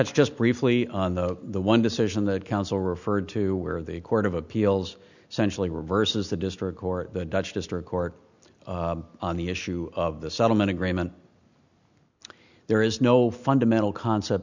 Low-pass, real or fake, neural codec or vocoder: 7.2 kHz; real; none